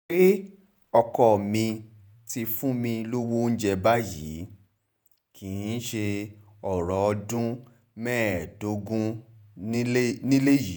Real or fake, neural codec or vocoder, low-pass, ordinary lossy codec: fake; vocoder, 48 kHz, 128 mel bands, Vocos; none; none